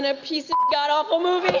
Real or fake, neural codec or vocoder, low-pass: real; none; 7.2 kHz